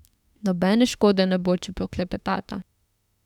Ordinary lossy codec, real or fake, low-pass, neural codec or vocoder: none; fake; 19.8 kHz; autoencoder, 48 kHz, 32 numbers a frame, DAC-VAE, trained on Japanese speech